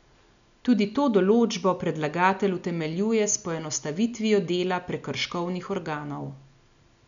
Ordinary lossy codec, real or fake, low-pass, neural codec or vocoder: none; real; 7.2 kHz; none